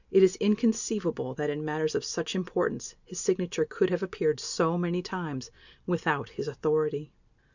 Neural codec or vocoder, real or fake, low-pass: none; real; 7.2 kHz